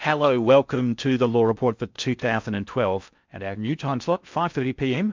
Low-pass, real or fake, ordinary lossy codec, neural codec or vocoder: 7.2 kHz; fake; MP3, 64 kbps; codec, 16 kHz in and 24 kHz out, 0.6 kbps, FocalCodec, streaming, 4096 codes